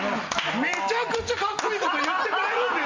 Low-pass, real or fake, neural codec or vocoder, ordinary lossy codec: 7.2 kHz; real; none; Opus, 32 kbps